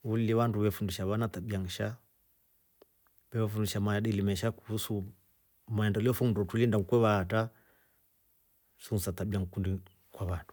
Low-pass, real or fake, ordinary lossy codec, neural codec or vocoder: none; real; none; none